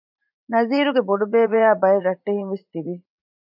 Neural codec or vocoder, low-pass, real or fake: vocoder, 44.1 kHz, 80 mel bands, Vocos; 5.4 kHz; fake